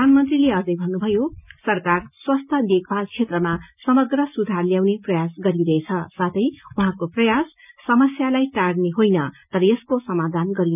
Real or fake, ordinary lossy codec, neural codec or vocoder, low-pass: real; none; none; 3.6 kHz